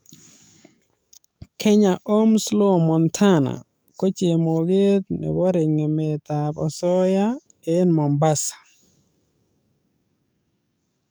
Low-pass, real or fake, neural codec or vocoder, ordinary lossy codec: none; fake; codec, 44.1 kHz, 7.8 kbps, DAC; none